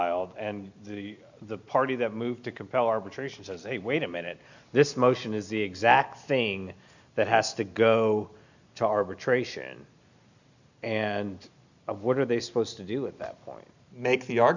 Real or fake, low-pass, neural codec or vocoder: real; 7.2 kHz; none